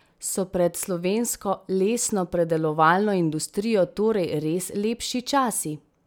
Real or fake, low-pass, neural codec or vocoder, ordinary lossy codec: real; none; none; none